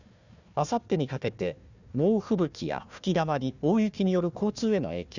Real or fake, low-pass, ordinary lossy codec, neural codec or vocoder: fake; 7.2 kHz; none; codec, 16 kHz, 1 kbps, FunCodec, trained on Chinese and English, 50 frames a second